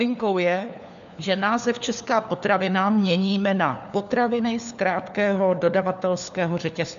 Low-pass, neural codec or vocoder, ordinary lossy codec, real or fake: 7.2 kHz; codec, 16 kHz, 4 kbps, FunCodec, trained on LibriTTS, 50 frames a second; MP3, 96 kbps; fake